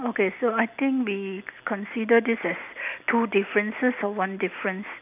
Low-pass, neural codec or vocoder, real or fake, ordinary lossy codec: 3.6 kHz; none; real; none